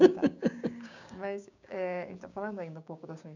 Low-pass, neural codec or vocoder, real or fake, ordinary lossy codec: 7.2 kHz; codec, 16 kHz, 6 kbps, DAC; fake; none